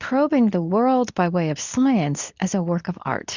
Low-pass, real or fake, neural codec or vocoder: 7.2 kHz; fake; codec, 24 kHz, 0.9 kbps, WavTokenizer, medium speech release version 2